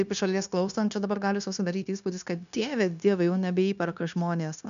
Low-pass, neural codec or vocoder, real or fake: 7.2 kHz; codec, 16 kHz, 0.9 kbps, LongCat-Audio-Codec; fake